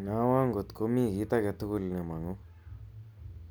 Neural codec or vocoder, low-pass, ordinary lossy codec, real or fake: none; none; none; real